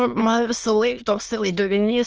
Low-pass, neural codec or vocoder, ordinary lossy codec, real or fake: 7.2 kHz; autoencoder, 22.05 kHz, a latent of 192 numbers a frame, VITS, trained on many speakers; Opus, 24 kbps; fake